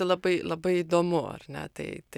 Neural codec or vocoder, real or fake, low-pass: none; real; 19.8 kHz